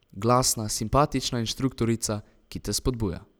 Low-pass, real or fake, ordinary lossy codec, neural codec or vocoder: none; real; none; none